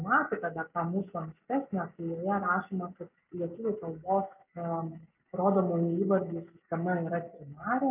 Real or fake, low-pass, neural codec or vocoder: real; 3.6 kHz; none